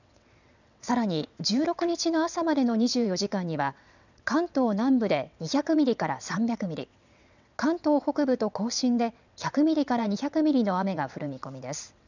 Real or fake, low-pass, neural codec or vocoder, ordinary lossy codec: fake; 7.2 kHz; vocoder, 22.05 kHz, 80 mel bands, WaveNeXt; none